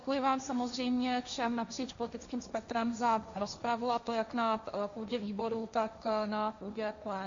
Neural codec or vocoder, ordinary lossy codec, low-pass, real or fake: codec, 16 kHz, 1.1 kbps, Voila-Tokenizer; MP3, 64 kbps; 7.2 kHz; fake